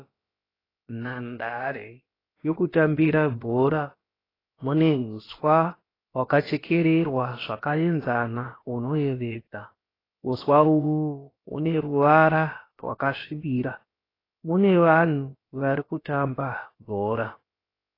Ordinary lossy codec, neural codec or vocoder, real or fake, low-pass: AAC, 24 kbps; codec, 16 kHz, about 1 kbps, DyCAST, with the encoder's durations; fake; 5.4 kHz